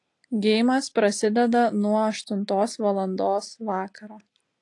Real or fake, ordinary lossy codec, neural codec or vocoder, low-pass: real; AAC, 48 kbps; none; 10.8 kHz